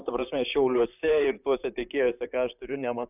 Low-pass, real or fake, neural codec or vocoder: 3.6 kHz; fake; vocoder, 44.1 kHz, 128 mel bands, Pupu-Vocoder